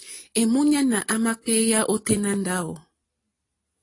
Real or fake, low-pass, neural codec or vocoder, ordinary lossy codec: real; 10.8 kHz; none; AAC, 32 kbps